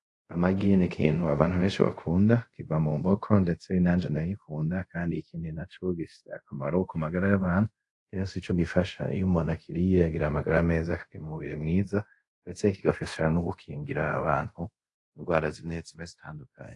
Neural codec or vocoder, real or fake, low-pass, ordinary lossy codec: codec, 24 kHz, 0.5 kbps, DualCodec; fake; 10.8 kHz; AAC, 48 kbps